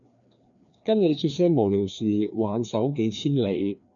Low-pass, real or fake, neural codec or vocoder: 7.2 kHz; fake; codec, 16 kHz, 2 kbps, FreqCodec, larger model